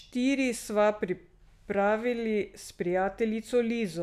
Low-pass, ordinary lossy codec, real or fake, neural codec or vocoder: 14.4 kHz; none; real; none